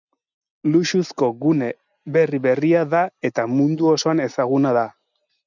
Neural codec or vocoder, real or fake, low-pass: none; real; 7.2 kHz